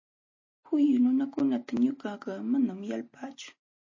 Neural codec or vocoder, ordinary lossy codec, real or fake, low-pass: none; MP3, 32 kbps; real; 7.2 kHz